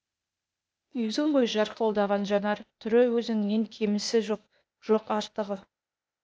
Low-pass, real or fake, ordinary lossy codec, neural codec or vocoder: none; fake; none; codec, 16 kHz, 0.8 kbps, ZipCodec